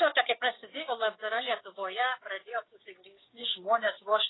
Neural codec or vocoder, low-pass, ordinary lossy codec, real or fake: codec, 16 kHz, 6 kbps, DAC; 7.2 kHz; AAC, 16 kbps; fake